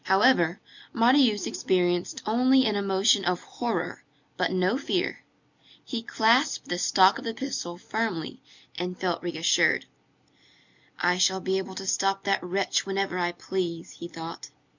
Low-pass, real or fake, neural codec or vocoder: 7.2 kHz; real; none